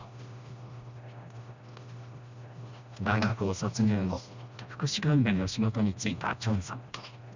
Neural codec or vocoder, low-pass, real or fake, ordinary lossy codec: codec, 16 kHz, 1 kbps, FreqCodec, smaller model; 7.2 kHz; fake; Opus, 64 kbps